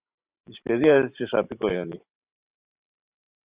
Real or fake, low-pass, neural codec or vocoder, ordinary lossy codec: fake; 3.6 kHz; vocoder, 44.1 kHz, 128 mel bands every 512 samples, BigVGAN v2; Opus, 64 kbps